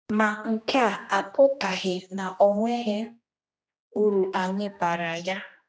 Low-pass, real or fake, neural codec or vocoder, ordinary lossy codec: none; fake; codec, 16 kHz, 1 kbps, X-Codec, HuBERT features, trained on general audio; none